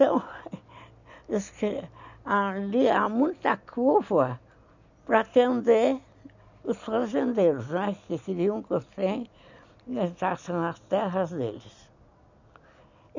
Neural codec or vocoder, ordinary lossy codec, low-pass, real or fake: none; none; 7.2 kHz; real